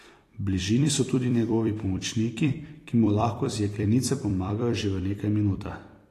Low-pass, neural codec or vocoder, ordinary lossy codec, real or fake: 14.4 kHz; vocoder, 44.1 kHz, 128 mel bands every 256 samples, BigVGAN v2; AAC, 48 kbps; fake